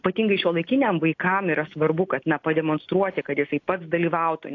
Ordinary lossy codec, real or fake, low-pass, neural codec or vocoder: AAC, 48 kbps; real; 7.2 kHz; none